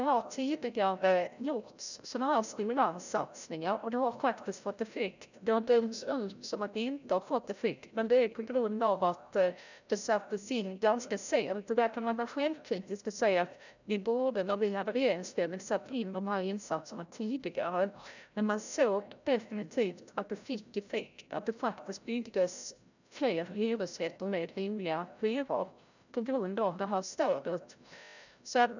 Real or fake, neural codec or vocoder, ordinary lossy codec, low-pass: fake; codec, 16 kHz, 0.5 kbps, FreqCodec, larger model; none; 7.2 kHz